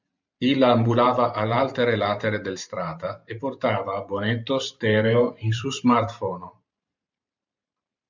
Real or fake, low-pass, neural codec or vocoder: fake; 7.2 kHz; vocoder, 44.1 kHz, 128 mel bands every 512 samples, BigVGAN v2